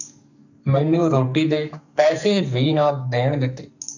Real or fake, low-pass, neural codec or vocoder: fake; 7.2 kHz; codec, 44.1 kHz, 2.6 kbps, SNAC